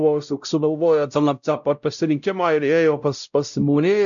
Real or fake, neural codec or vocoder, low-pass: fake; codec, 16 kHz, 0.5 kbps, X-Codec, WavLM features, trained on Multilingual LibriSpeech; 7.2 kHz